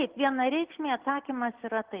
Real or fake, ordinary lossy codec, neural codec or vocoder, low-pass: real; Opus, 16 kbps; none; 3.6 kHz